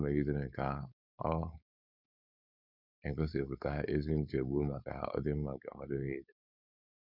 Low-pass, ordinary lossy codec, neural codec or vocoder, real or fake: 5.4 kHz; none; codec, 16 kHz, 4.8 kbps, FACodec; fake